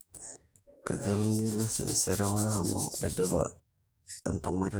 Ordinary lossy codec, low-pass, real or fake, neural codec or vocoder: none; none; fake; codec, 44.1 kHz, 2.6 kbps, DAC